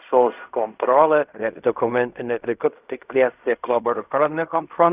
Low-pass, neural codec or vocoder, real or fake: 3.6 kHz; codec, 16 kHz in and 24 kHz out, 0.4 kbps, LongCat-Audio-Codec, fine tuned four codebook decoder; fake